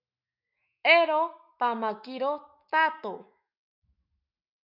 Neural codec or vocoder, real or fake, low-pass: autoencoder, 48 kHz, 128 numbers a frame, DAC-VAE, trained on Japanese speech; fake; 5.4 kHz